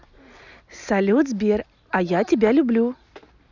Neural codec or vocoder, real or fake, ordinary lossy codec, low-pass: none; real; none; 7.2 kHz